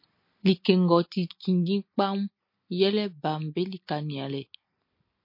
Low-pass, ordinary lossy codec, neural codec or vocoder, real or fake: 5.4 kHz; MP3, 32 kbps; none; real